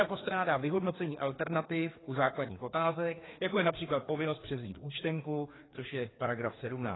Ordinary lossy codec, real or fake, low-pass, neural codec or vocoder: AAC, 16 kbps; fake; 7.2 kHz; codec, 16 kHz, 2 kbps, FreqCodec, larger model